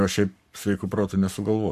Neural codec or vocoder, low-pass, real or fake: codec, 44.1 kHz, 7.8 kbps, Pupu-Codec; 14.4 kHz; fake